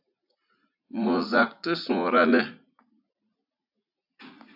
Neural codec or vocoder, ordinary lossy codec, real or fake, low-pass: vocoder, 44.1 kHz, 80 mel bands, Vocos; AAC, 48 kbps; fake; 5.4 kHz